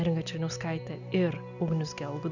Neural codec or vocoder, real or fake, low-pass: none; real; 7.2 kHz